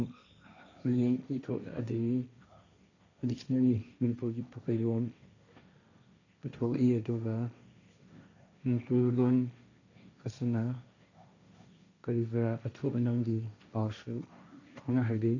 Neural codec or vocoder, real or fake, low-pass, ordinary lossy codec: codec, 16 kHz, 1.1 kbps, Voila-Tokenizer; fake; 7.2 kHz; none